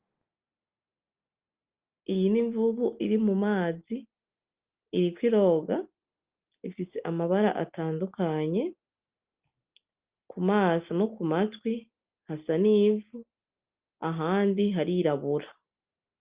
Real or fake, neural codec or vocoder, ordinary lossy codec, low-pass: real; none; Opus, 32 kbps; 3.6 kHz